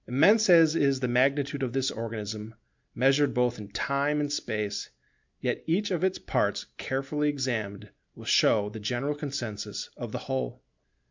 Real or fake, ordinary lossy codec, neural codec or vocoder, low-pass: real; MP3, 64 kbps; none; 7.2 kHz